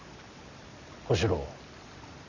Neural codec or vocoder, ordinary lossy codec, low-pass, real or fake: none; none; 7.2 kHz; real